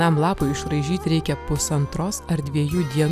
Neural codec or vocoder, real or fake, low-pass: none; real; 14.4 kHz